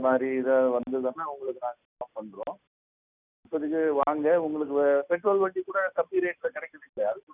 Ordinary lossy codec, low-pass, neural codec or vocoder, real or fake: none; 3.6 kHz; none; real